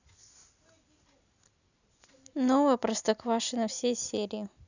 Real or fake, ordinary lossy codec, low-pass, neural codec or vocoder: real; none; 7.2 kHz; none